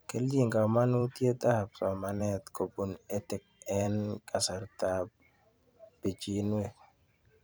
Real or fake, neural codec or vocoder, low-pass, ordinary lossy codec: fake; vocoder, 44.1 kHz, 128 mel bands every 512 samples, BigVGAN v2; none; none